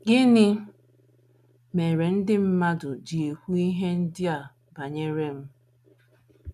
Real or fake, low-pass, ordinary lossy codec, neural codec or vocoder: real; 14.4 kHz; none; none